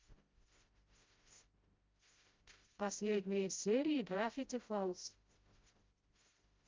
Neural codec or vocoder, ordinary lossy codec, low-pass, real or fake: codec, 16 kHz, 0.5 kbps, FreqCodec, smaller model; Opus, 24 kbps; 7.2 kHz; fake